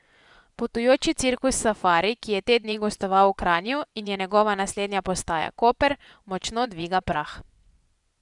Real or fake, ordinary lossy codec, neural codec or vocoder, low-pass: fake; none; vocoder, 24 kHz, 100 mel bands, Vocos; 10.8 kHz